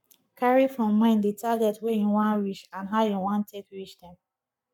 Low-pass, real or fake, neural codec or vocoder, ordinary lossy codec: 19.8 kHz; fake; codec, 44.1 kHz, 7.8 kbps, Pupu-Codec; none